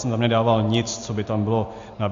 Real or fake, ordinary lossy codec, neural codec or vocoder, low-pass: real; AAC, 48 kbps; none; 7.2 kHz